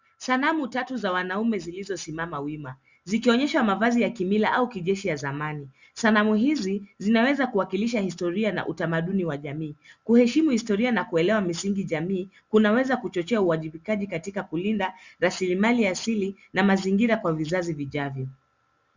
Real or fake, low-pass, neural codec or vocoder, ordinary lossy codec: real; 7.2 kHz; none; Opus, 64 kbps